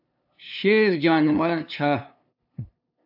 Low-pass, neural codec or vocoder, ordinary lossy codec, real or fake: 5.4 kHz; codec, 16 kHz, 2 kbps, FunCodec, trained on LibriTTS, 25 frames a second; AAC, 48 kbps; fake